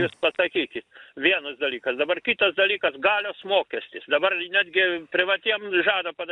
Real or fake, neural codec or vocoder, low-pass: real; none; 10.8 kHz